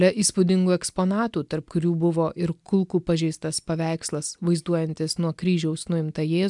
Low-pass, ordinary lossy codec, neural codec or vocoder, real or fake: 10.8 kHz; MP3, 96 kbps; none; real